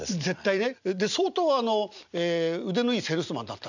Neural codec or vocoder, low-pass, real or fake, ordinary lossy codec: none; 7.2 kHz; real; none